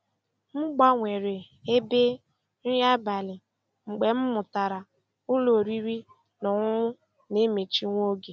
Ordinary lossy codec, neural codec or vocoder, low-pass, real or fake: none; none; none; real